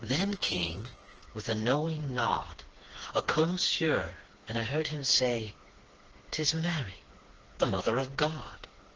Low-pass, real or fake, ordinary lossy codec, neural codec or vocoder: 7.2 kHz; fake; Opus, 16 kbps; codec, 16 kHz, 2 kbps, FreqCodec, smaller model